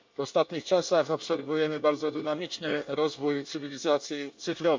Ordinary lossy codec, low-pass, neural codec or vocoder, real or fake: MP3, 48 kbps; 7.2 kHz; codec, 24 kHz, 1 kbps, SNAC; fake